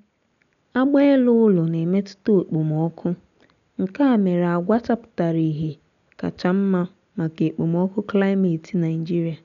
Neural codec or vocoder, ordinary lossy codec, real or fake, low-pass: none; none; real; 7.2 kHz